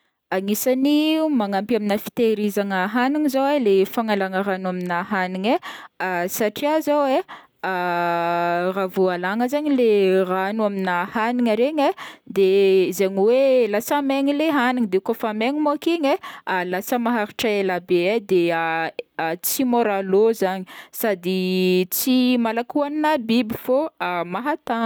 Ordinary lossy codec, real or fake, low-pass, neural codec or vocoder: none; real; none; none